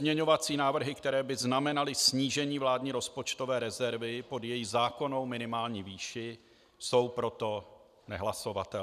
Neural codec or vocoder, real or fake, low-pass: none; real; 14.4 kHz